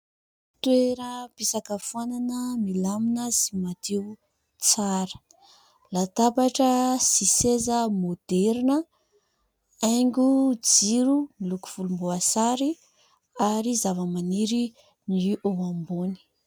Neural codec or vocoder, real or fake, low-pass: none; real; 19.8 kHz